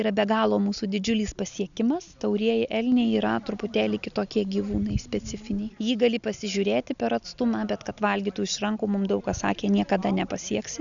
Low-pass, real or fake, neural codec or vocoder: 7.2 kHz; real; none